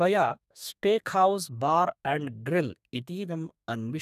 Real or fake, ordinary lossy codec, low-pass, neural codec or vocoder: fake; none; 14.4 kHz; codec, 32 kHz, 1.9 kbps, SNAC